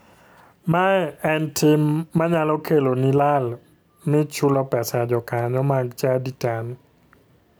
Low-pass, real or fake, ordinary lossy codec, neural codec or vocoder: none; real; none; none